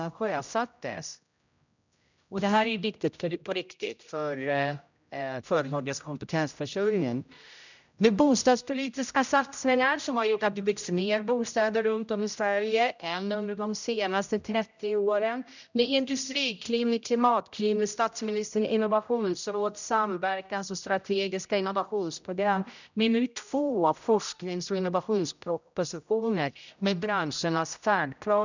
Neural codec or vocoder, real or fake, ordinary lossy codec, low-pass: codec, 16 kHz, 0.5 kbps, X-Codec, HuBERT features, trained on general audio; fake; none; 7.2 kHz